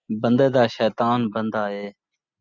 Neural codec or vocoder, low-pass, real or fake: none; 7.2 kHz; real